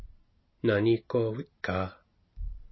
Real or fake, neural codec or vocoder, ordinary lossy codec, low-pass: real; none; MP3, 24 kbps; 7.2 kHz